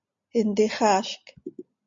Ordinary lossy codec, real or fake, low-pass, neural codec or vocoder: MP3, 48 kbps; real; 7.2 kHz; none